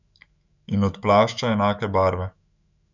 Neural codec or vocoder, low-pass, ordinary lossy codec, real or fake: autoencoder, 48 kHz, 128 numbers a frame, DAC-VAE, trained on Japanese speech; 7.2 kHz; none; fake